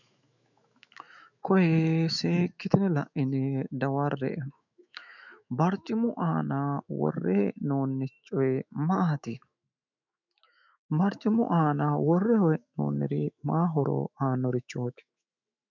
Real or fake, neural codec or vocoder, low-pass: fake; autoencoder, 48 kHz, 128 numbers a frame, DAC-VAE, trained on Japanese speech; 7.2 kHz